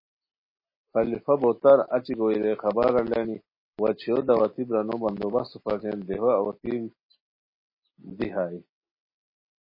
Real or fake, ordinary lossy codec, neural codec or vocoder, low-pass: real; MP3, 24 kbps; none; 5.4 kHz